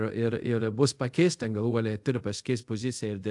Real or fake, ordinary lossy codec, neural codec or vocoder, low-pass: fake; AAC, 64 kbps; codec, 24 kHz, 0.5 kbps, DualCodec; 10.8 kHz